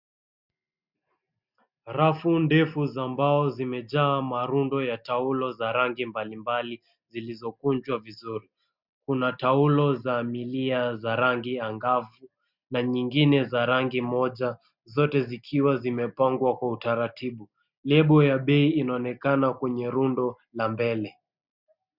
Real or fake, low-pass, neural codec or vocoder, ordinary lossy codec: real; 5.4 kHz; none; Opus, 64 kbps